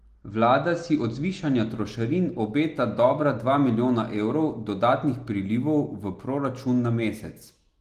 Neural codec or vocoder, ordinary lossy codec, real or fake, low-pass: none; Opus, 24 kbps; real; 14.4 kHz